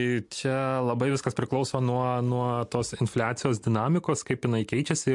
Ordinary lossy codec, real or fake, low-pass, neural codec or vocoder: MP3, 64 kbps; real; 10.8 kHz; none